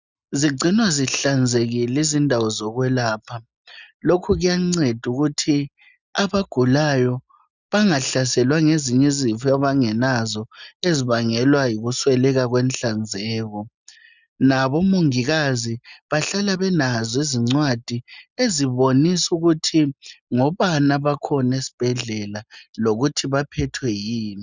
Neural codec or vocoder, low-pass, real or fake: none; 7.2 kHz; real